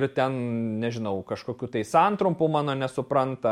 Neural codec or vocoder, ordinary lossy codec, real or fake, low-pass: none; MP3, 64 kbps; real; 19.8 kHz